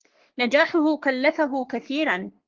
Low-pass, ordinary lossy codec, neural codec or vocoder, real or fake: 7.2 kHz; Opus, 16 kbps; codec, 44.1 kHz, 3.4 kbps, Pupu-Codec; fake